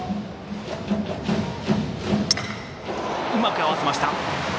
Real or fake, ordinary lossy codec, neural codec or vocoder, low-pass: real; none; none; none